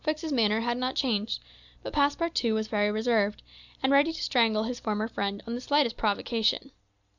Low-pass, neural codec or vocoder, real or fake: 7.2 kHz; none; real